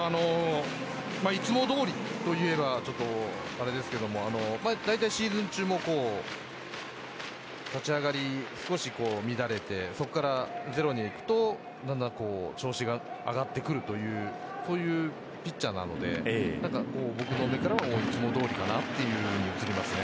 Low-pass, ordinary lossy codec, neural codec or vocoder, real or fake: none; none; none; real